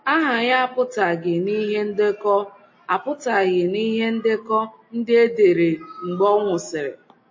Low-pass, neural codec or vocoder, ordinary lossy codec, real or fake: 7.2 kHz; none; MP3, 32 kbps; real